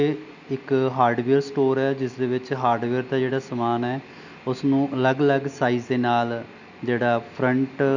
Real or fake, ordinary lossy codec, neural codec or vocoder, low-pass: real; none; none; 7.2 kHz